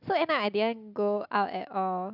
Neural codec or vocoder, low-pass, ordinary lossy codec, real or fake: none; 5.4 kHz; none; real